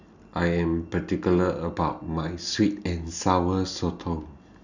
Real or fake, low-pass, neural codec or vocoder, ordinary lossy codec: real; 7.2 kHz; none; none